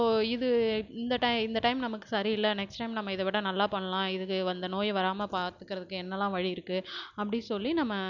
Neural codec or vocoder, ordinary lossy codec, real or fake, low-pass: none; none; real; 7.2 kHz